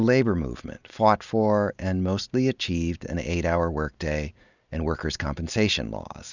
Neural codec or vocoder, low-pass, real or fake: none; 7.2 kHz; real